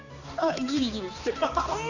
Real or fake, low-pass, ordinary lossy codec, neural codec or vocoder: fake; 7.2 kHz; none; codec, 16 kHz, 2 kbps, X-Codec, HuBERT features, trained on balanced general audio